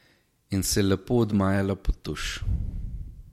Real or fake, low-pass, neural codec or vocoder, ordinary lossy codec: real; 19.8 kHz; none; MP3, 64 kbps